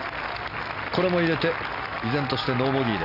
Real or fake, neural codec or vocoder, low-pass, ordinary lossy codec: real; none; 5.4 kHz; none